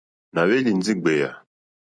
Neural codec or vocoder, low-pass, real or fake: none; 9.9 kHz; real